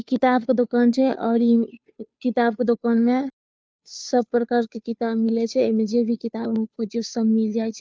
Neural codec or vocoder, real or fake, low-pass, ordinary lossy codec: codec, 16 kHz, 2 kbps, FunCodec, trained on Chinese and English, 25 frames a second; fake; none; none